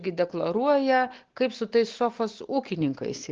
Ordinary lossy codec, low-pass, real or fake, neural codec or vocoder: Opus, 16 kbps; 7.2 kHz; real; none